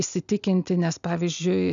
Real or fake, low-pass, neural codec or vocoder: real; 7.2 kHz; none